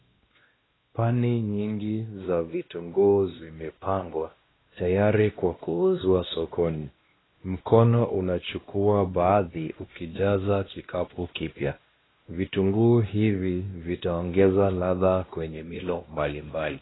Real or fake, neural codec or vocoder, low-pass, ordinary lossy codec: fake; codec, 16 kHz, 1 kbps, X-Codec, WavLM features, trained on Multilingual LibriSpeech; 7.2 kHz; AAC, 16 kbps